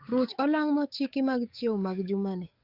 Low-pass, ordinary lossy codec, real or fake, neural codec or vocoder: 5.4 kHz; Opus, 64 kbps; fake; codec, 44.1 kHz, 7.8 kbps, DAC